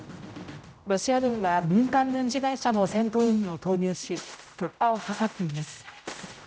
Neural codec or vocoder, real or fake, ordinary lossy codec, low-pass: codec, 16 kHz, 0.5 kbps, X-Codec, HuBERT features, trained on general audio; fake; none; none